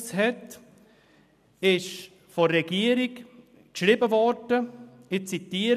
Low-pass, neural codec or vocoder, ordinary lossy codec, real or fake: 14.4 kHz; none; none; real